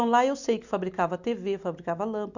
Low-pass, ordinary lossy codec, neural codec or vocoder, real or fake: 7.2 kHz; none; none; real